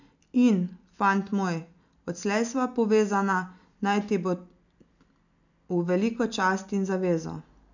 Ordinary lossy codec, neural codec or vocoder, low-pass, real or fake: none; none; 7.2 kHz; real